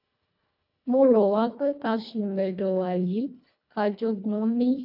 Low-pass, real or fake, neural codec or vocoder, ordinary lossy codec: 5.4 kHz; fake; codec, 24 kHz, 1.5 kbps, HILCodec; none